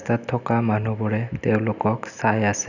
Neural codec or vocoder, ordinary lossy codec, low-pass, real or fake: none; none; 7.2 kHz; real